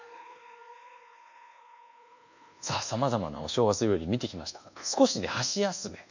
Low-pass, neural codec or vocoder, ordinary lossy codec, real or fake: 7.2 kHz; codec, 24 kHz, 1.2 kbps, DualCodec; none; fake